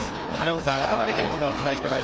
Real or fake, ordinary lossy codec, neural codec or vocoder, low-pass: fake; none; codec, 16 kHz, 2 kbps, FreqCodec, larger model; none